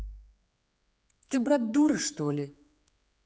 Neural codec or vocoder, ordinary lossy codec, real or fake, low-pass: codec, 16 kHz, 4 kbps, X-Codec, HuBERT features, trained on general audio; none; fake; none